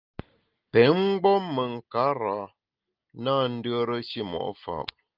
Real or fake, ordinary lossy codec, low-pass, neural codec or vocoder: real; Opus, 24 kbps; 5.4 kHz; none